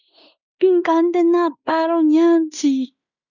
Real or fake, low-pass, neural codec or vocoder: fake; 7.2 kHz; codec, 16 kHz in and 24 kHz out, 0.9 kbps, LongCat-Audio-Codec, fine tuned four codebook decoder